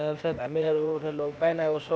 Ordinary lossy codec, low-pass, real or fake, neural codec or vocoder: none; none; fake; codec, 16 kHz, 0.8 kbps, ZipCodec